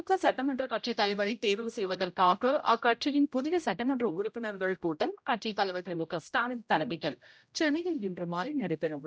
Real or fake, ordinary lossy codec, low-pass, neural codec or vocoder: fake; none; none; codec, 16 kHz, 0.5 kbps, X-Codec, HuBERT features, trained on general audio